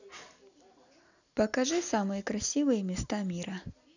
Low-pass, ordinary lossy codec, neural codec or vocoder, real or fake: 7.2 kHz; none; none; real